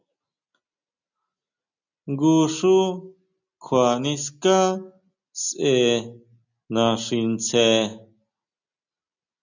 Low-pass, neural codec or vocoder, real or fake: 7.2 kHz; none; real